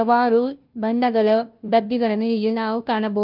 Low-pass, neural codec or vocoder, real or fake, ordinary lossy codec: 5.4 kHz; codec, 16 kHz, 0.5 kbps, FunCodec, trained on Chinese and English, 25 frames a second; fake; Opus, 24 kbps